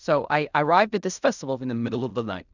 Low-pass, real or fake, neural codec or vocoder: 7.2 kHz; fake; codec, 16 kHz in and 24 kHz out, 0.4 kbps, LongCat-Audio-Codec, fine tuned four codebook decoder